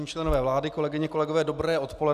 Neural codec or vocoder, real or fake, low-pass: none; real; 14.4 kHz